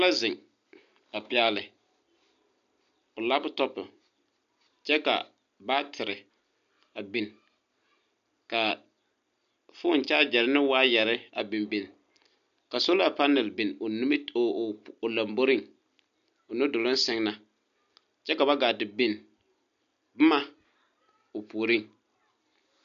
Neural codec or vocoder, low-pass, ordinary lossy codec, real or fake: none; 7.2 kHz; AAC, 96 kbps; real